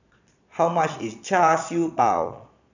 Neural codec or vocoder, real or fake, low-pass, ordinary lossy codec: vocoder, 22.05 kHz, 80 mel bands, Vocos; fake; 7.2 kHz; none